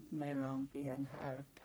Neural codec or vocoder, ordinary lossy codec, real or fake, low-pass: codec, 44.1 kHz, 1.7 kbps, Pupu-Codec; none; fake; none